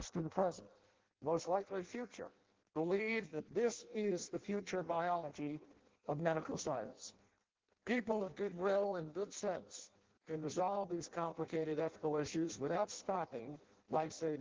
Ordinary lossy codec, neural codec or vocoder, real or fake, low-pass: Opus, 16 kbps; codec, 16 kHz in and 24 kHz out, 0.6 kbps, FireRedTTS-2 codec; fake; 7.2 kHz